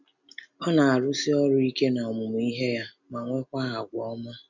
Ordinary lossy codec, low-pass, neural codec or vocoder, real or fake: none; 7.2 kHz; none; real